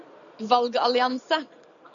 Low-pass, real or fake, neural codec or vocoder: 7.2 kHz; real; none